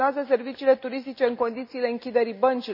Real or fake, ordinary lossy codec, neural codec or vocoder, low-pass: real; none; none; 5.4 kHz